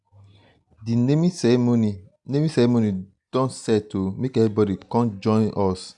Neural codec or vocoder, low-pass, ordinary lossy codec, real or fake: none; 10.8 kHz; none; real